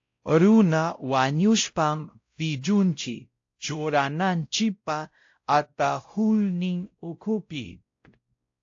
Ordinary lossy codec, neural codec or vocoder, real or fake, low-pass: AAC, 48 kbps; codec, 16 kHz, 0.5 kbps, X-Codec, WavLM features, trained on Multilingual LibriSpeech; fake; 7.2 kHz